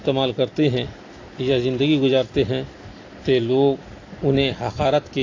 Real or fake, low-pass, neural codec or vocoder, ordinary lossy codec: real; 7.2 kHz; none; AAC, 32 kbps